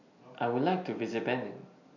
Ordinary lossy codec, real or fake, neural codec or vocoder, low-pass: none; real; none; 7.2 kHz